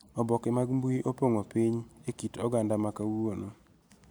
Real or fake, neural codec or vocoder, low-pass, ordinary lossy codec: real; none; none; none